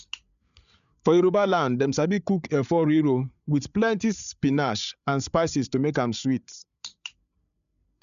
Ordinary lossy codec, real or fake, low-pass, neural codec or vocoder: none; fake; 7.2 kHz; codec, 16 kHz, 8 kbps, FreqCodec, larger model